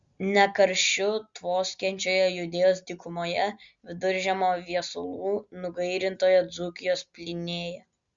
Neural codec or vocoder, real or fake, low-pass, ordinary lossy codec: none; real; 7.2 kHz; Opus, 64 kbps